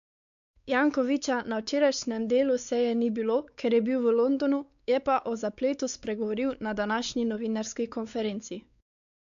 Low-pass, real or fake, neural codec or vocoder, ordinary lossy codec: 7.2 kHz; fake; codec, 16 kHz, 4 kbps, X-Codec, WavLM features, trained on Multilingual LibriSpeech; AAC, 96 kbps